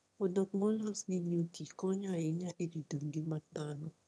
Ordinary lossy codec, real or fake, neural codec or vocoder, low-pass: none; fake; autoencoder, 22.05 kHz, a latent of 192 numbers a frame, VITS, trained on one speaker; none